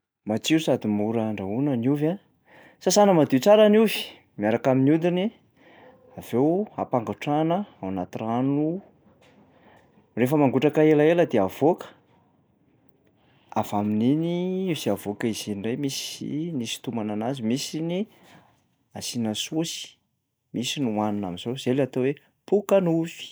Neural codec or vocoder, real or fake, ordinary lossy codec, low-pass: none; real; none; none